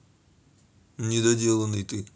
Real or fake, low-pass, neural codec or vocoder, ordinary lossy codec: real; none; none; none